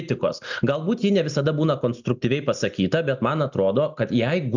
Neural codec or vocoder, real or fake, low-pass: none; real; 7.2 kHz